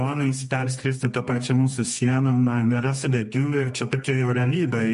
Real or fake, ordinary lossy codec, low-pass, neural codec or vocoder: fake; MP3, 48 kbps; 10.8 kHz; codec, 24 kHz, 0.9 kbps, WavTokenizer, medium music audio release